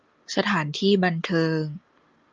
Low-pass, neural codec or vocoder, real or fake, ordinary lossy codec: 7.2 kHz; none; real; Opus, 24 kbps